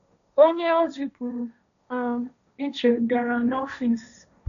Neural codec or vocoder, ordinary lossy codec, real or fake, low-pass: codec, 16 kHz, 1.1 kbps, Voila-Tokenizer; none; fake; 7.2 kHz